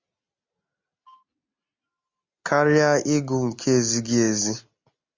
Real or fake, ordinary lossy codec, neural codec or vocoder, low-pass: real; MP3, 64 kbps; none; 7.2 kHz